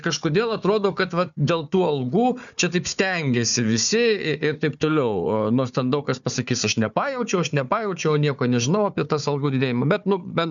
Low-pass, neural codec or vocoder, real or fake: 7.2 kHz; codec, 16 kHz, 4 kbps, FunCodec, trained on Chinese and English, 50 frames a second; fake